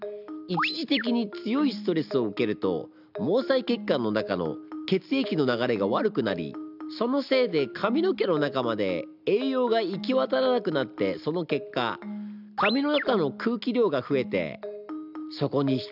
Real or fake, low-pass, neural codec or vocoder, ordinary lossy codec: real; 5.4 kHz; none; none